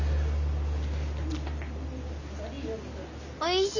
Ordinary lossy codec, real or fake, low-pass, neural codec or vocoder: none; real; 7.2 kHz; none